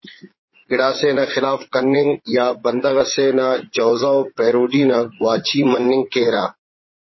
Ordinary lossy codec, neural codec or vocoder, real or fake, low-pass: MP3, 24 kbps; vocoder, 22.05 kHz, 80 mel bands, Vocos; fake; 7.2 kHz